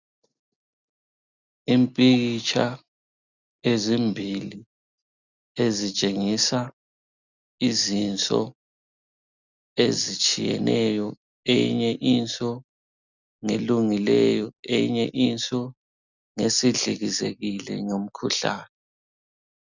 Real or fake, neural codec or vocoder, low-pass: real; none; 7.2 kHz